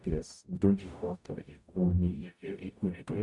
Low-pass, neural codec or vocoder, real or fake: 10.8 kHz; codec, 44.1 kHz, 0.9 kbps, DAC; fake